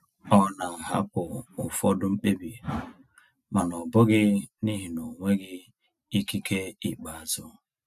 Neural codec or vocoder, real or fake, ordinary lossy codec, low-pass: none; real; none; 14.4 kHz